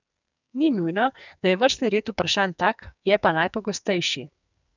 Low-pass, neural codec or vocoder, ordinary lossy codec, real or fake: 7.2 kHz; codec, 44.1 kHz, 2.6 kbps, SNAC; none; fake